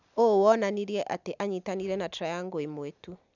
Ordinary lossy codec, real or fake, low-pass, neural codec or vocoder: none; real; 7.2 kHz; none